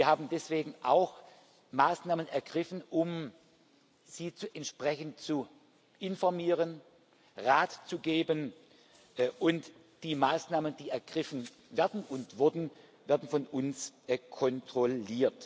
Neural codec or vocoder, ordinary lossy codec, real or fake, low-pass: none; none; real; none